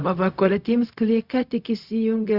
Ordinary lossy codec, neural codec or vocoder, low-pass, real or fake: Opus, 64 kbps; codec, 16 kHz, 0.4 kbps, LongCat-Audio-Codec; 5.4 kHz; fake